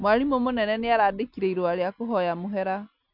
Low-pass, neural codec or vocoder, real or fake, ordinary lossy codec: 5.4 kHz; none; real; none